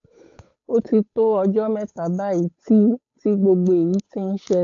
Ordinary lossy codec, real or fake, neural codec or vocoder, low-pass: AAC, 48 kbps; fake; codec, 16 kHz, 8 kbps, FunCodec, trained on Chinese and English, 25 frames a second; 7.2 kHz